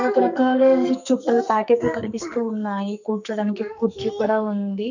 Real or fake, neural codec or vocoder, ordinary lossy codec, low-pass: fake; codec, 44.1 kHz, 2.6 kbps, SNAC; none; 7.2 kHz